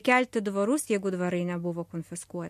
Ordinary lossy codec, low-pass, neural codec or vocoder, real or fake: MP3, 64 kbps; 14.4 kHz; none; real